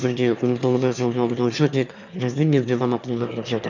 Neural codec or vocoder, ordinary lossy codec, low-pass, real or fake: autoencoder, 22.05 kHz, a latent of 192 numbers a frame, VITS, trained on one speaker; none; 7.2 kHz; fake